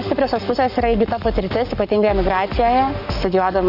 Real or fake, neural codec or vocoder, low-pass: fake; codec, 44.1 kHz, 7.8 kbps, Pupu-Codec; 5.4 kHz